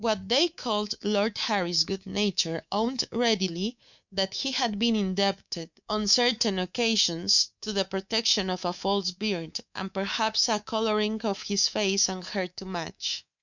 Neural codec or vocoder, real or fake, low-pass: codec, 24 kHz, 3.1 kbps, DualCodec; fake; 7.2 kHz